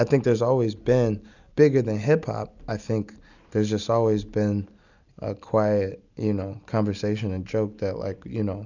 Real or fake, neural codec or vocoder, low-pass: real; none; 7.2 kHz